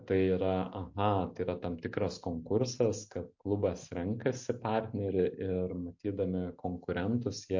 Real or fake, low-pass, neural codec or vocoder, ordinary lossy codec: real; 7.2 kHz; none; MP3, 48 kbps